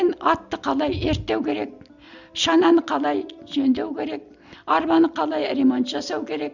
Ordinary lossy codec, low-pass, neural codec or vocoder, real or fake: none; 7.2 kHz; none; real